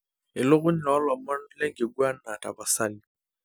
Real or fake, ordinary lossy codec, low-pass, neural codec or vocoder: real; none; none; none